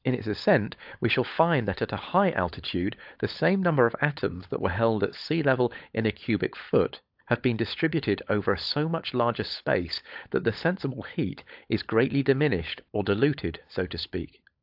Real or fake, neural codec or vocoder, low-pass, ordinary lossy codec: fake; codec, 16 kHz, 16 kbps, FunCodec, trained on LibriTTS, 50 frames a second; 5.4 kHz; AAC, 48 kbps